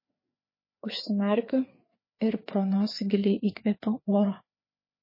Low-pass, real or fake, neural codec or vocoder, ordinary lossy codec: 5.4 kHz; fake; codec, 16 kHz, 4 kbps, FreqCodec, larger model; MP3, 24 kbps